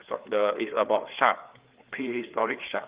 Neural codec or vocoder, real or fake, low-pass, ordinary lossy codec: codec, 16 kHz, 4 kbps, FreqCodec, larger model; fake; 3.6 kHz; Opus, 32 kbps